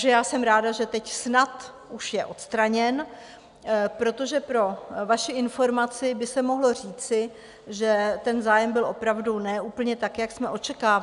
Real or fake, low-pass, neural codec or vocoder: real; 10.8 kHz; none